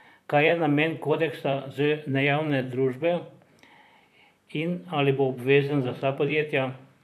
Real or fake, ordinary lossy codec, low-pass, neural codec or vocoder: fake; none; 14.4 kHz; vocoder, 44.1 kHz, 128 mel bands, Pupu-Vocoder